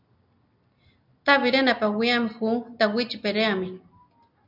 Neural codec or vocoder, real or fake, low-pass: none; real; 5.4 kHz